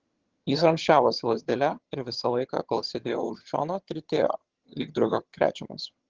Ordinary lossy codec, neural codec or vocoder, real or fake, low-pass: Opus, 16 kbps; vocoder, 22.05 kHz, 80 mel bands, HiFi-GAN; fake; 7.2 kHz